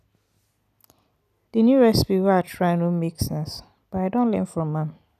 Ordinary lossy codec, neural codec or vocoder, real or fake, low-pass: none; none; real; 14.4 kHz